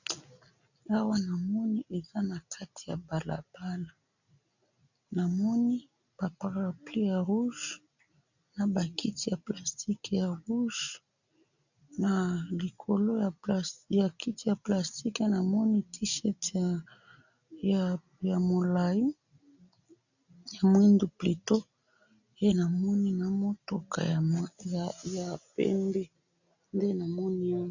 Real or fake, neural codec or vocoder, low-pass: real; none; 7.2 kHz